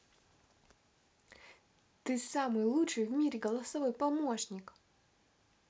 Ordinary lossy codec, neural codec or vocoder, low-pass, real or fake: none; none; none; real